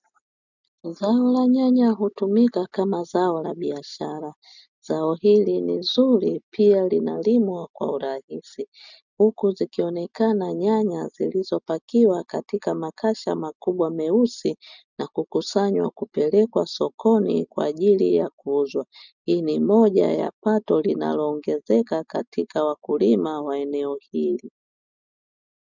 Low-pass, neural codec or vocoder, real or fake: 7.2 kHz; none; real